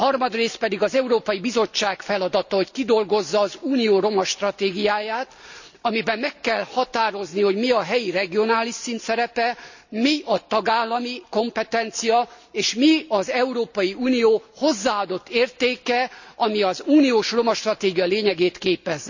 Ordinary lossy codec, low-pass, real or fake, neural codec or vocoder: none; 7.2 kHz; real; none